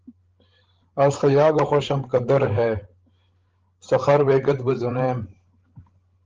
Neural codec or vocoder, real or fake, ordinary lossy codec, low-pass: codec, 16 kHz, 16 kbps, FreqCodec, larger model; fake; Opus, 16 kbps; 7.2 kHz